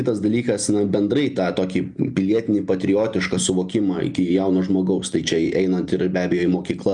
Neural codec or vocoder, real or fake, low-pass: none; real; 10.8 kHz